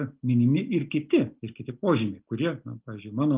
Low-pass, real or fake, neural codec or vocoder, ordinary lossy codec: 3.6 kHz; real; none; Opus, 32 kbps